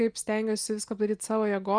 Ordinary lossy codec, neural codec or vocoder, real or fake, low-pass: Opus, 32 kbps; none; real; 9.9 kHz